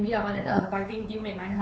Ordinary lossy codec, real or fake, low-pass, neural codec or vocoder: none; fake; none; codec, 16 kHz, 4 kbps, X-Codec, WavLM features, trained on Multilingual LibriSpeech